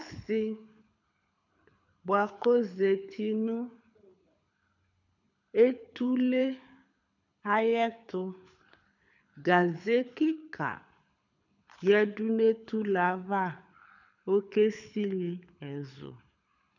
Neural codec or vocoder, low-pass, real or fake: codec, 24 kHz, 6 kbps, HILCodec; 7.2 kHz; fake